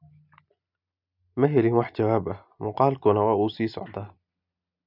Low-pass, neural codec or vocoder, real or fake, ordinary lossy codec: 5.4 kHz; none; real; none